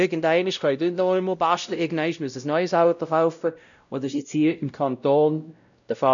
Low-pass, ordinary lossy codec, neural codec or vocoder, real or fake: 7.2 kHz; none; codec, 16 kHz, 0.5 kbps, X-Codec, WavLM features, trained on Multilingual LibriSpeech; fake